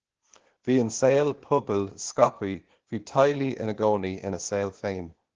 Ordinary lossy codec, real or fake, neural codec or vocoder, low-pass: Opus, 16 kbps; fake; codec, 16 kHz, 0.8 kbps, ZipCodec; 7.2 kHz